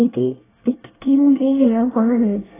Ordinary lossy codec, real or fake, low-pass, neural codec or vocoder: AAC, 16 kbps; fake; 3.6 kHz; codec, 24 kHz, 1 kbps, SNAC